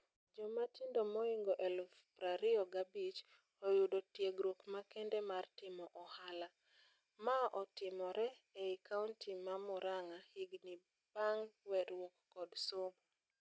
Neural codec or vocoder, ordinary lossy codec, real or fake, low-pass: none; none; real; none